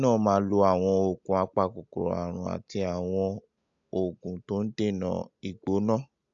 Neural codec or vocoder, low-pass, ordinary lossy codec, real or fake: none; 7.2 kHz; AAC, 64 kbps; real